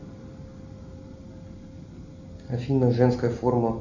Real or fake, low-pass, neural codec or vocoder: real; 7.2 kHz; none